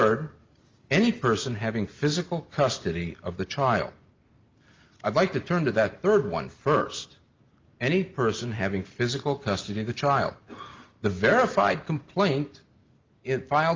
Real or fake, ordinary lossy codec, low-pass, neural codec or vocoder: real; Opus, 32 kbps; 7.2 kHz; none